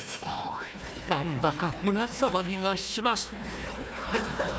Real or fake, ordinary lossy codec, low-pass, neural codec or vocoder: fake; none; none; codec, 16 kHz, 1 kbps, FunCodec, trained on Chinese and English, 50 frames a second